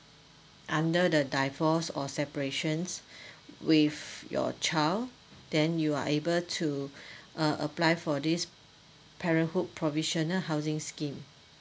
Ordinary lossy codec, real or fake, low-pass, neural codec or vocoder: none; real; none; none